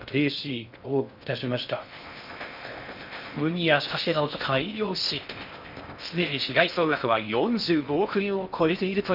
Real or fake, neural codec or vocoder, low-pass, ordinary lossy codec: fake; codec, 16 kHz in and 24 kHz out, 0.6 kbps, FocalCodec, streaming, 2048 codes; 5.4 kHz; none